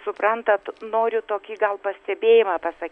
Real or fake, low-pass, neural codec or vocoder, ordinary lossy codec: real; 9.9 kHz; none; MP3, 96 kbps